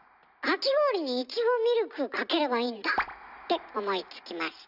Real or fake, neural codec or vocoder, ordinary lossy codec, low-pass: real; none; none; 5.4 kHz